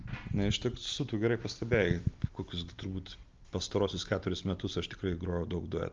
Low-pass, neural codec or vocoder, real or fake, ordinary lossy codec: 7.2 kHz; none; real; Opus, 32 kbps